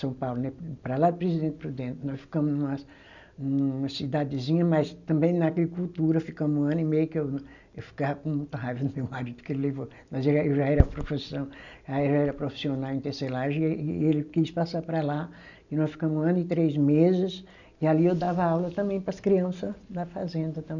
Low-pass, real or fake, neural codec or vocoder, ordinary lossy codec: 7.2 kHz; real; none; none